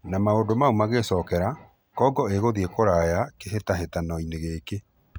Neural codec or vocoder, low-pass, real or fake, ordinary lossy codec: none; none; real; none